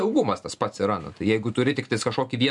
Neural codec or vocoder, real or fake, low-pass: none; real; 10.8 kHz